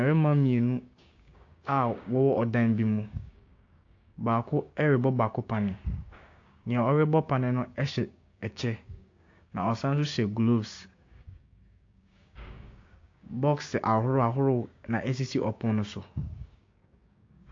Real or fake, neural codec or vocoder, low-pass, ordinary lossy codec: fake; codec, 16 kHz, 6 kbps, DAC; 7.2 kHz; AAC, 48 kbps